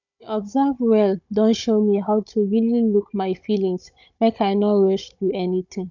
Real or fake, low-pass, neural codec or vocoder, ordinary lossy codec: fake; 7.2 kHz; codec, 16 kHz, 16 kbps, FunCodec, trained on Chinese and English, 50 frames a second; none